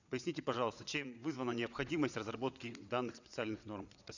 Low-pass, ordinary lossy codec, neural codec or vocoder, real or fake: 7.2 kHz; none; vocoder, 22.05 kHz, 80 mel bands, WaveNeXt; fake